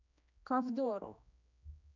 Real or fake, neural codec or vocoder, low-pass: fake; codec, 16 kHz, 1 kbps, X-Codec, HuBERT features, trained on general audio; 7.2 kHz